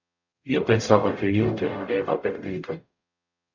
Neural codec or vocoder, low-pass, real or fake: codec, 44.1 kHz, 0.9 kbps, DAC; 7.2 kHz; fake